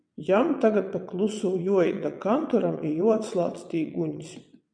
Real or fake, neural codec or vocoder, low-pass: fake; vocoder, 22.05 kHz, 80 mel bands, WaveNeXt; 9.9 kHz